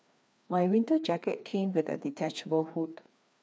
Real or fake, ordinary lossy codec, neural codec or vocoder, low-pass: fake; none; codec, 16 kHz, 2 kbps, FreqCodec, larger model; none